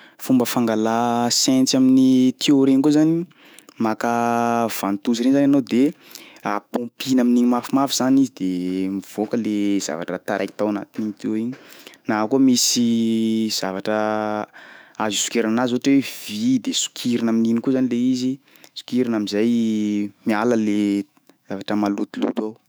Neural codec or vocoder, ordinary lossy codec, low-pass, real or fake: autoencoder, 48 kHz, 128 numbers a frame, DAC-VAE, trained on Japanese speech; none; none; fake